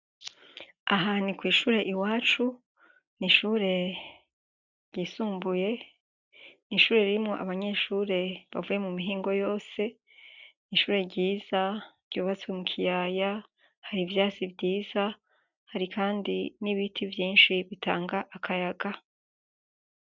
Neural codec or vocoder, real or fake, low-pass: none; real; 7.2 kHz